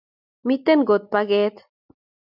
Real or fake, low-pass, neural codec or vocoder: real; 5.4 kHz; none